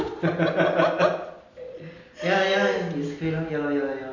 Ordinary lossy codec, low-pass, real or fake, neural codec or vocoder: none; 7.2 kHz; real; none